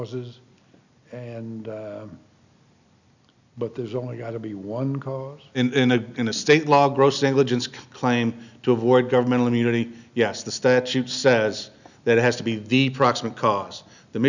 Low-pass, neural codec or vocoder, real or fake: 7.2 kHz; none; real